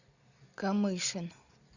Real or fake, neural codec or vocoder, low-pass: real; none; 7.2 kHz